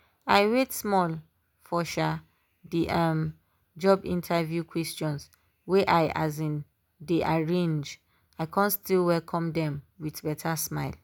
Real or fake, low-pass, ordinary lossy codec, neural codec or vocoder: real; none; none; none